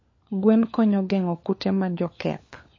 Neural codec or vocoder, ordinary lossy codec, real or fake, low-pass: codec, 16 kHz, 4 kbps, FunCodec, trained on LibriTTS, 50 frames a second; MP3, 32 kbps; fake; 7.2 kHz